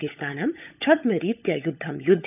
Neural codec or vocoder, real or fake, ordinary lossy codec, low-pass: codec, 16 kHz, 16 kbps, FunCodec, trained on LibriTTS, 50 frames a second; fake; none; 3.6 kHz